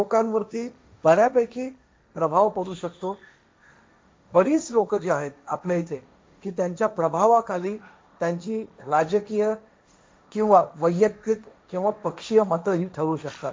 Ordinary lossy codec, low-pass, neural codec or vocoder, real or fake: none; none; codec, 16 kHz, 1.1 kbps, Voila-Tokenizer; fake